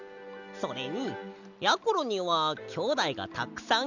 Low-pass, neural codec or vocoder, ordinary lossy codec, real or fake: 7.2 kHz; none; none; real